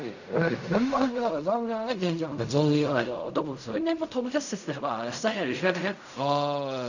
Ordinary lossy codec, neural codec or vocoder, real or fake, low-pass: none; codec, 16 kHz in and 24 kHz out, 0.4 kbps, LongCat-Audio-Codec, fine tuned four codebook decoder; fake; 7.2 kHz